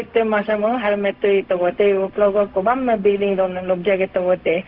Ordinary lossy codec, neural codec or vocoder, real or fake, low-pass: Opus, 24 kbps; codec, 16 kHz, 0.4 kbps, LongCat-Audio-Codec; fake; 5.4 kHz